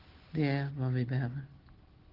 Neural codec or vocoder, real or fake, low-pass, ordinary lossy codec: none; real; 5.4 kHz; Opus, 16 kbps